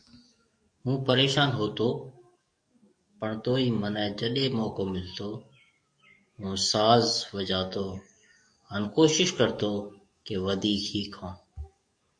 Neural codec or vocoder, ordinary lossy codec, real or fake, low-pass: codec, 44.1 kHz, 7.8 kbps, DAC; MP3, 48 kbps; fake; 9.9 kHz